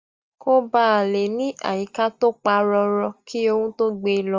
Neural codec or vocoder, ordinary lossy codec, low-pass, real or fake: none; Opus, 32 kbps; 7.2 kHz; real